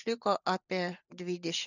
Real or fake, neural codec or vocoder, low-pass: real; none; 7.2 kHz